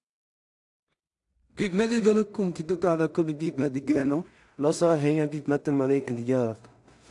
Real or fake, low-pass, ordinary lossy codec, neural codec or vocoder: fake; 10.8 kHz; AAC, 64 kbps; codec, 16 kHz in and 24 kHz out, 0.4 kbps, LongCat-Audio-Codec, two codebook decoder